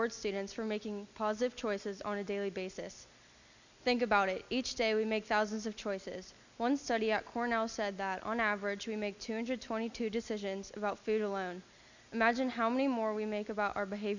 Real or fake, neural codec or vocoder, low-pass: real; none; 7.2 kHz